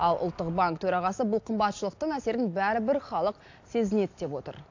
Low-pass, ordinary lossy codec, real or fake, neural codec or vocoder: 7.2 kHz; AAC, 48 kbps; real; none